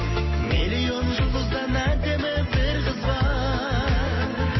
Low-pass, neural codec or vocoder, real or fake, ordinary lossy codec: 7.2 kHz; none; real; MP3, 24 kbps